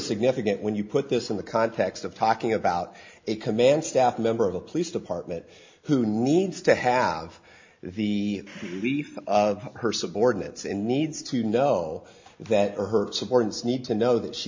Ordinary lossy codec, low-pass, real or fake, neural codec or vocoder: MP3, 48 kbps; 7.2 kHz; real; none